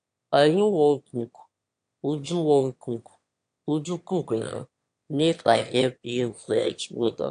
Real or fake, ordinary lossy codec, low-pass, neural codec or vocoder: fake; AAC, 64 kbps; 9.9 kHz; autoencoder, 22.05 kHz, a latent of 192 numbers a frame, VITS, trained on one speaker